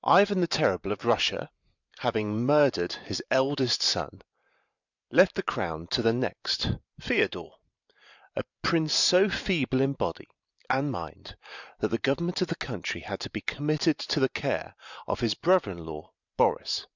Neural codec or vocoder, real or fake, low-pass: vocoder, 44.1 kHz, 128 mel bands every 512 samples, BigVGAN v2; fake; 7.2 kHz